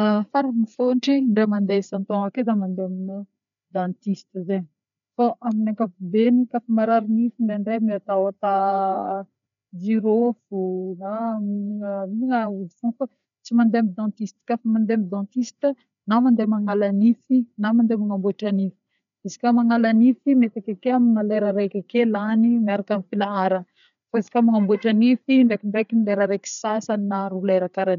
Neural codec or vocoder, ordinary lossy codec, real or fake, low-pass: codec, 16 kHz, 4 kbps, FreqCodec, larger model; none; fake; 7.2 kHz